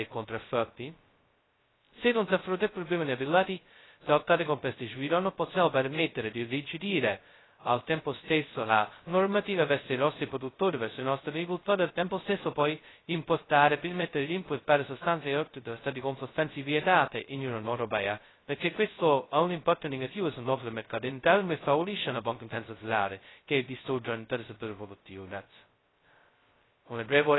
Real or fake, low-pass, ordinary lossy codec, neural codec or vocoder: fake; 7.2 kHz; AAC, 16 kbps; codec, 16 kHz, 0.2 kbps, FocalCodec